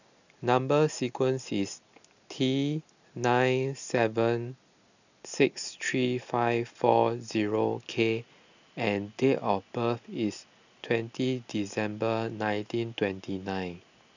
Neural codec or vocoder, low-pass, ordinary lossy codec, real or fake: none; 7.2 kHz; none; real